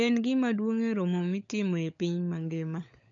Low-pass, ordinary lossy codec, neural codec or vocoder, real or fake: 7.2 kHz; AAC, 64 kbps; codec, 16 kHz, 16 kbps, FunCodec, trained on LibriTTS, 50 frames a second; fake